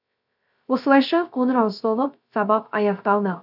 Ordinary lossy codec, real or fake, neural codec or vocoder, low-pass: none; fake; codec, 16 kHz, 0.3 kbps, FocalCodec; 5.4 kHz